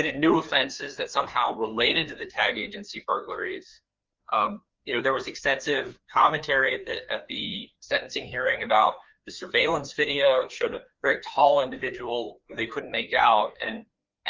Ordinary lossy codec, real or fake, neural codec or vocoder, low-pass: Opus, 24 kbps; fake; codec, 16 kHz, 2 kbps, FreqCodec, larger model; 7.2 kHz